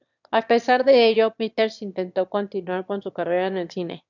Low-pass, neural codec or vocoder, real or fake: 7.2 kHz; autoencoder, 22.05 kHz, a latent of 192 numbers a frame, VITS, trained on one speaker; fake